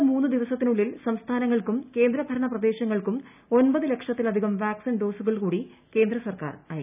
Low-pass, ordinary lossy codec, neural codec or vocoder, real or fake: 3.6 kHz; none; none; real